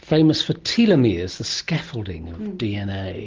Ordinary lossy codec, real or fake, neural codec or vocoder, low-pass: Opus, 16 kbps; real; none; 7.2 kHz